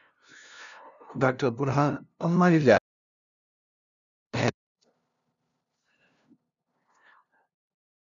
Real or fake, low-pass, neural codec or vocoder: fake; 7.2 kHz; codec, 16 kHz, 0.5 kbps, FunCodec, trained on LibriTTS, 25 frames a second